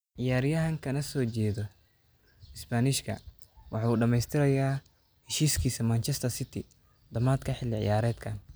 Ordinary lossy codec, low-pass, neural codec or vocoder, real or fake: none; none; none; real